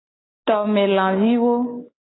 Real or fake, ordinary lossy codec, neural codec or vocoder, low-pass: real; AAC, 16 kbps; none; 7.2 kHz